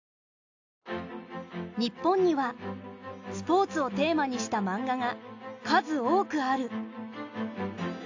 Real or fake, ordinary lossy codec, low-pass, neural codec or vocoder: real; AAC, 48 kbps; 7.2 kHz; none